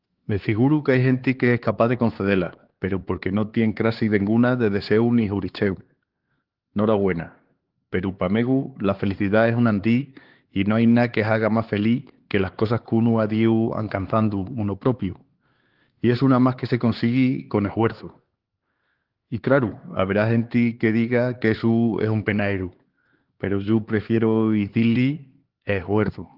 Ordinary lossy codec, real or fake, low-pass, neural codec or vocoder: Opus, 16 kbps; fake; 5.4 kHz; codec, 16 kHz, 4 kbps, X-Codec, HuBERT features, trained on LibriSpeech